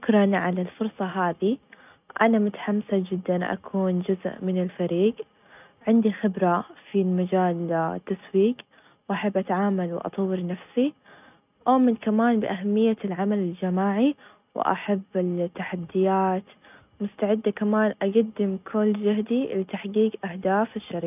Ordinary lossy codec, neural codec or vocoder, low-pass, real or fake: none; none; 3.6 kHz; real